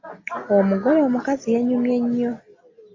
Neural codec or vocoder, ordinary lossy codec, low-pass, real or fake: none; AAC, 32 kbps; 7.2 kHz; real